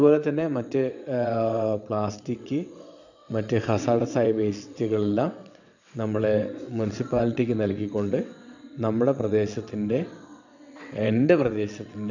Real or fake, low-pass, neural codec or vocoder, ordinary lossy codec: fake; 7.2 kHz; vocoder, 22.05 kHz, 80 mel bands, WaveNeXt; none